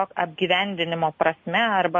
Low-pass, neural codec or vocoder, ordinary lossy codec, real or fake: 7.2 kHz; none; MP3, 32 kbps; real